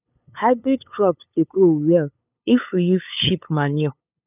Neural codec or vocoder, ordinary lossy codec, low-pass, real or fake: codec, 16 kHz, 8 kbps, FunCodec, trained on LibriTTS, 25 frames a second; none; 3.6 kHz; fake